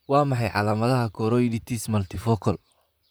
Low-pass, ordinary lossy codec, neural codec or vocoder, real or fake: none; none; vocoder, 44.1 kHz, 128 mel bands, Pupu-Vocoder; fake